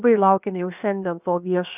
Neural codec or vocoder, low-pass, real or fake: codec, 16 kHz, 0.7 kbps, FocalCodec; 3.6 kHz; fake